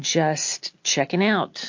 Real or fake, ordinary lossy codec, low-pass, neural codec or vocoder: real; MP3, 48 kbps; 7.2 kHz; none